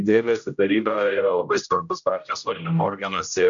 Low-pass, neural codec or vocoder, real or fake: 7.2 kHz; codec, 16 kHz, 0.5 kbps, X-Codec, HuBERT features, trained on general audio; fake